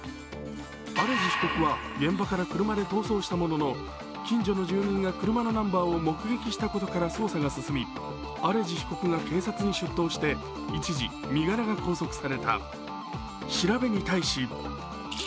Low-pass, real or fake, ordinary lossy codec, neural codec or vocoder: none; real; none; none